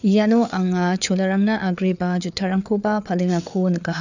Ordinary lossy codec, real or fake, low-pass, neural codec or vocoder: none; fake; 7.2 kHz; codec, 16 kHz, 4 kbps, FreqCodec, larger model